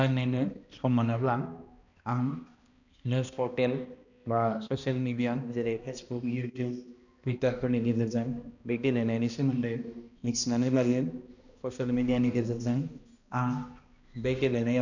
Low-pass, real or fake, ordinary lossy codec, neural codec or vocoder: 7.2 kHz; fake; none; codec, 16 kHz, 1 kbps, X-Codec, HuBERT features, trained on balanced general audio